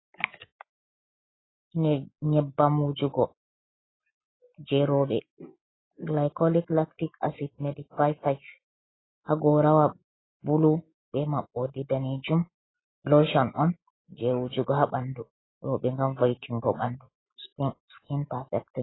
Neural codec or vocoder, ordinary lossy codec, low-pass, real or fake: none; AAC, 16 kbps; 7.2 kHz; real